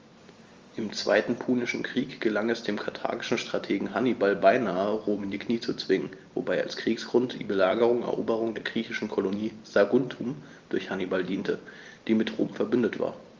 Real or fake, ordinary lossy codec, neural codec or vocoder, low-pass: real; Opus, 32 kbps; none; 7.2 kHz